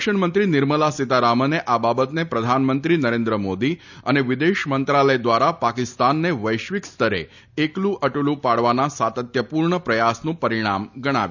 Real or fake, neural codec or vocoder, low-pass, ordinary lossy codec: real; none; 7.2 kHz; none